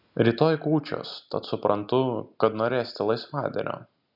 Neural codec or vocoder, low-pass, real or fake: none; 5.4 kHz; real